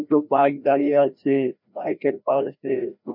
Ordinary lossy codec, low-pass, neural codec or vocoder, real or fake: MP3, 48 kbps; 5.4 kHz; codec, 16 kHz, 1 kbps, FreqCodec, larger model; fake